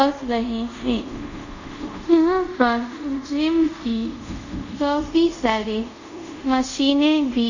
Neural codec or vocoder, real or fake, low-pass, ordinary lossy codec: codec, 24 kHz, 0.5 kbps, DualCodec; fake; 7.2 kHz; Opus, 64 kbps